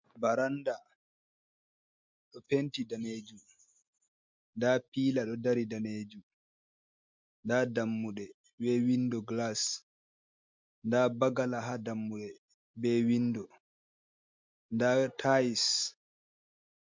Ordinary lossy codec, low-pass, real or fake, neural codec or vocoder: MP3, 64 kbps; 7.2 kHz; real; none